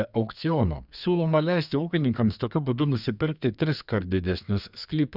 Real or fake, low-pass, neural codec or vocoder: fake; 5.4 kHz; codec, 32 kHz, 1.9 kbps, SNAC